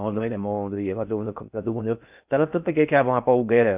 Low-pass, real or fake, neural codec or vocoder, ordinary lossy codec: 3.6 kHz; fake; codec, 16 kHz in and 24 kHz out, 0.6 kbps, FocalCodec, streaming, 4096 codes; none